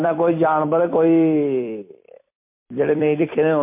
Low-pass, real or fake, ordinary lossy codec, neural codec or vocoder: 3.6 kHz; fake; MP3, 24 kbps; vocoder, 44.1 kHz, 128 mel bands every 256 samples, BigVGAN v2